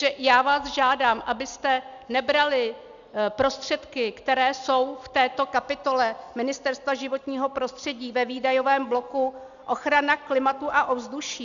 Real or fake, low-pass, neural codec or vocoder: real; 7.2 kHz; none